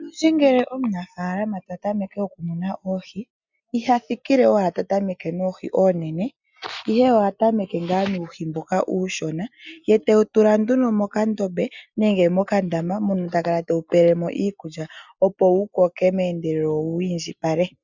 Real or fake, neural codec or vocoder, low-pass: real; none; 7.2 kHz